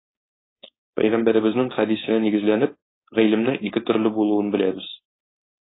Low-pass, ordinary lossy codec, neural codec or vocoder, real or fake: 7.2 kHz; AAC, 16 kbps; codec, 16 kHz, 4.8 kbps, FACodec; fake